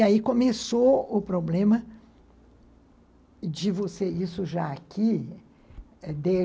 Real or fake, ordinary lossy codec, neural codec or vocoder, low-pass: real; none; none; none